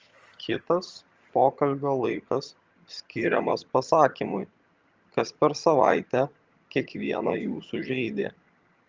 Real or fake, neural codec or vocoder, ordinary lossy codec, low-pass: fake; vocoder, 22.05 kHz, 80 mel bands, HiFi-GAN; Opus, 24 kbps; 7.2 kHz